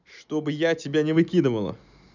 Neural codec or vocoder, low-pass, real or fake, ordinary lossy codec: none; 7.2 kHz; real; none